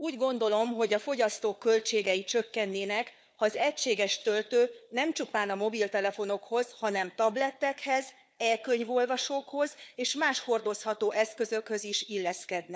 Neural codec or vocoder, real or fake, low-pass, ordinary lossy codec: codec, 16 kHz, 4 kbps, FunCodec, trained on Chinese and English, 50 frames a second; fake; none; none